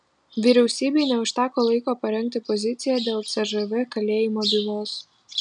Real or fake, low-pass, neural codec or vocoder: real; 9.9 kHz; none